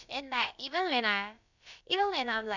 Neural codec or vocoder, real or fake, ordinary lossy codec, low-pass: codec, 16 kHz, about 1 kbps, DyCAST, with the encoder's durations; fake; none; 7.2 kHz